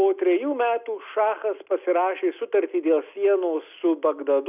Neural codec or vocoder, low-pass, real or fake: none; 3.6 kHz; real